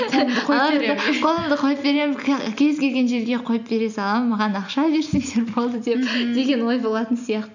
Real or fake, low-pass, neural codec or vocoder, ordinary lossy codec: real; 7.2 kHz; none; none